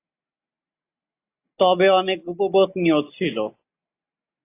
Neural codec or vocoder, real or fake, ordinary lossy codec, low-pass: none; real; AAC, 24 kbps; 3.6 kHz